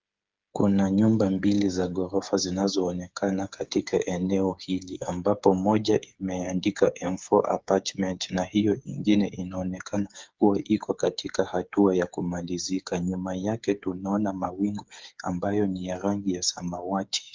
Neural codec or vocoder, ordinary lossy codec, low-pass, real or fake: codec, 16 kHz, 8 kbps, FreqCodec, smaller model; Opus, 32 kbps; 7.2 kHz; fake